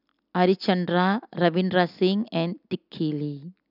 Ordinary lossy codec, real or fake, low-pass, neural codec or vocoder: none; real; 5.4 kHz; none